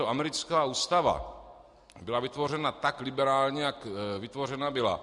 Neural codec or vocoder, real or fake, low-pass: none; real; 10.8 kHz